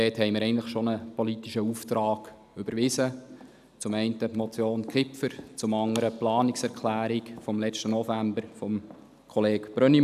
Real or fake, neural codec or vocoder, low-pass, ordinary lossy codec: real; none; 14.4 kHz; none